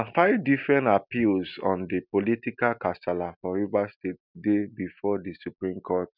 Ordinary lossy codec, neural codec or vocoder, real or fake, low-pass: none; none; real; 5.4 kHz